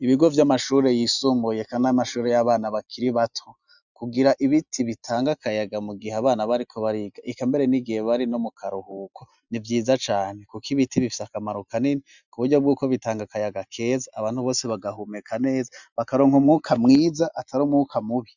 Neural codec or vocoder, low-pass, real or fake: none; 7.2 kHz; real